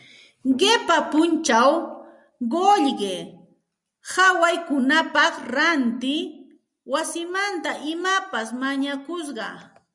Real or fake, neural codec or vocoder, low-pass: real; none; 10.8 kHz